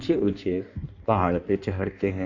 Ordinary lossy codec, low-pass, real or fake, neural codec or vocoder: none; 7.2 kHz; fake; codec, 16 kHz in and 24 kHz out, 1.1 kbps, FireRedTTS-2 codec